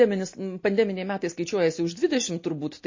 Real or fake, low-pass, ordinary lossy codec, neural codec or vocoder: real; 7.2 kHz; MP3, 32 kbps; none